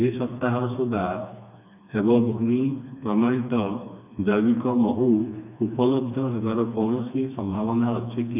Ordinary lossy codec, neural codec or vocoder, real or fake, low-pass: none; codec, 16 kHz, 2 kbps, FreqCodec, smaller model; fake; 3.6 kHz